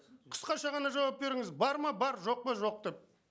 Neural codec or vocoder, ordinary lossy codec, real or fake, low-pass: none; none; real; none